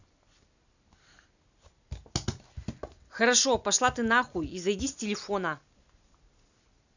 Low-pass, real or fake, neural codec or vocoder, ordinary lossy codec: 7.2 kHz; real; none; none